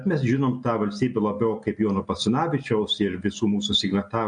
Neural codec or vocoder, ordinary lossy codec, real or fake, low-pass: none; MP3, 48 kbps; real; 9.9 kHz